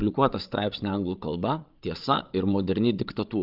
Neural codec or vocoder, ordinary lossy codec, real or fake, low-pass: codec, 16 kHz, 8 kbps, FreqCodec, larger model; Opus, 32 kbps; fake; 5.4 kHz